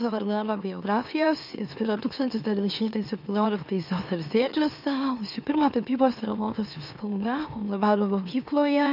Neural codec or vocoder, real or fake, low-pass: autoencoder, 44.1 kHz, a latent of 192 numbers a frame, MeloTTS; fake; 5.4 kHz